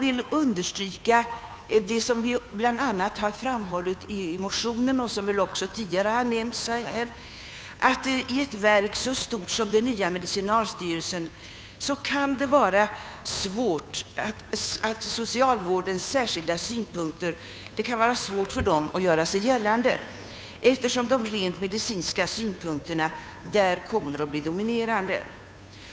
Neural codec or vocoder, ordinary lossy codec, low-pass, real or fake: codec, 16 kHz, 2 kbps, FunCodec, trained on Chinese and English, 25 frames a second; none; none; fake